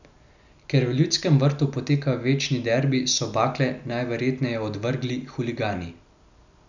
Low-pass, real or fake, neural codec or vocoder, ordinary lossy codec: 7.2 kHz; real; none; none